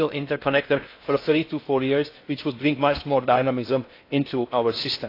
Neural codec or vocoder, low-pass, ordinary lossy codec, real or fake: codec, 16 kHz in and 24 kHz out, 0.6 kbps, FocalCodec, streaming, 4096 codes; 5.4 kHz; AAC, 32 kbps; fake